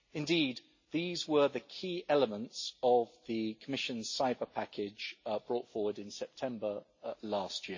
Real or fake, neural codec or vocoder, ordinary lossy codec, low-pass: real; none; MP3, 32 kbps; 7.2 kHz